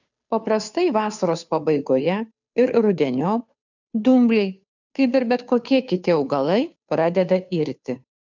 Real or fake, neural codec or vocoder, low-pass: fake; codec, 16 kHz, 2 kbps, FunCodec, trained on Chinese and English, 25 frames a second; 7.2 kHz